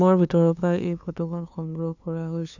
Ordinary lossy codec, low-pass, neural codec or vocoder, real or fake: none; 7.2 kHz; codec, 16 kHz, 2 kbps, FunCodec, trained on LibriTTS, 25 frames a second; fake